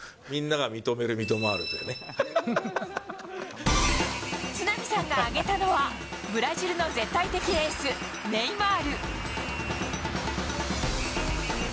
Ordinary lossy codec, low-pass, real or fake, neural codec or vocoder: none; none; real; none